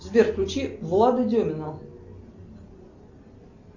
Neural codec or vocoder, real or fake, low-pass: none; real; 7.2 kHz